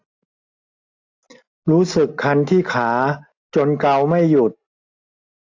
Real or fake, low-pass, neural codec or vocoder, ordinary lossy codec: real; 7.2 kHz; none; AAC, 48 kbps